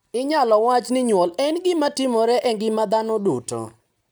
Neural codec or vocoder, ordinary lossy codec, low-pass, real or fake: none; none; none; real